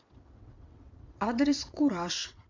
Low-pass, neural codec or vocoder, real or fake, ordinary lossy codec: 7.2 kHz; vocoder, 22.05 kHz, 80 mel bands, WaveNeXt; fake; MP3, 64 kbps